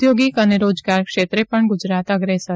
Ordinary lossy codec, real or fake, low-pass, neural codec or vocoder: none; real; none; none